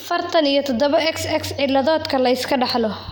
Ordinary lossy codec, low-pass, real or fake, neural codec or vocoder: none; none; real; none